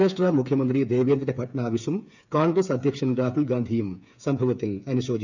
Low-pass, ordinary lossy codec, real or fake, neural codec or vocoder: 7.2 kHz; none; fake; codec, 16 kHz, 8 kbps, FreqCodec, smaller model